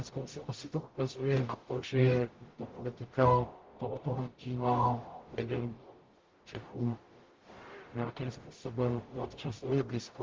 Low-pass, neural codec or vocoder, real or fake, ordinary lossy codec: 7.2 kHz; codec, 44.1 kHz, 0.9 kbps, DAC; fake; Opus, 16 kbps